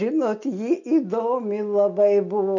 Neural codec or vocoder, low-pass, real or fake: none; 7.2 kHz; real